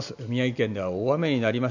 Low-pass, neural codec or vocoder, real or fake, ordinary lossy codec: 7.2 kHz; none; real; none